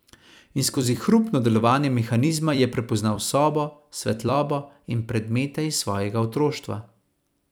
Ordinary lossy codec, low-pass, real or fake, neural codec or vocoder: none; none; fake; vocoder, 44.1 kHz, 128 mel bands every 256 samples, BigVGAN v2